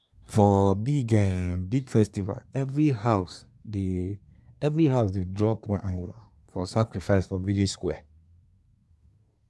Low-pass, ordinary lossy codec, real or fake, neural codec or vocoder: none; none; fake; codec, 24 kHz, 1 kbps, SNAC